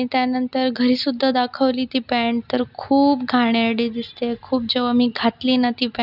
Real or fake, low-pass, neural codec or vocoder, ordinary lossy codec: real; 5.4 kHz; none; none